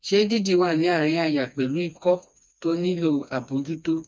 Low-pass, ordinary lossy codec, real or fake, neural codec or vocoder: none; none; fake; codec, 16 kHz, 2 kbps, FreqCodec, smaller model